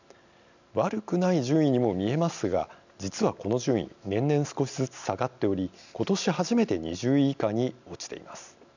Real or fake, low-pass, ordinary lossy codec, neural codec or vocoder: real; 7.2 kHz; none; none